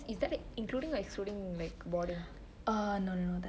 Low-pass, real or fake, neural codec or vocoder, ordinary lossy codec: none; real; none; none